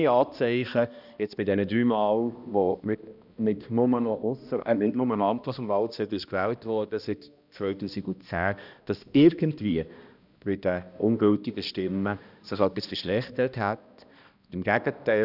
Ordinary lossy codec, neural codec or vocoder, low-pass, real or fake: none; codec, 16 kHz, 1 kbps, X-Codec, HuBERT features, trained on balanced general audio; 5.4 kHz; fake